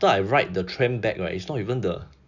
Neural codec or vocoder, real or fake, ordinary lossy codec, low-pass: none; real; none; 7.2 kHz